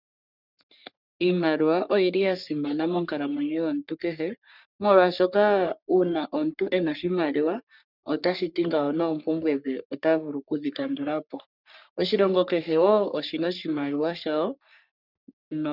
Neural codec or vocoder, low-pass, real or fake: codec, 44.1 kHz, 3.4 kbps, Pupu-Codec; 5.4 kHz; fake